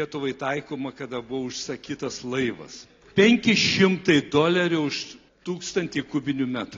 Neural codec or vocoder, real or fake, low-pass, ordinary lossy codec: none; real; 7.2 kHz; AAC, 32 kbps